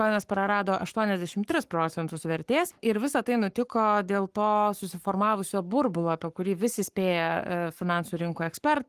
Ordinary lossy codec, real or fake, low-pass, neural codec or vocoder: Opus, 24 kbps; fake; 14.4 kHz; codec, 44.1 kHz, 7.8 kbps, Pupu-Codec